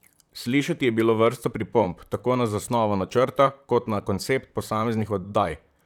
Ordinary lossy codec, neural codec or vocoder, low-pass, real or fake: none; vocoder, 44.1 kHz, 128 mel bands, Pupu-Vocoder; 19.8 kHz; fake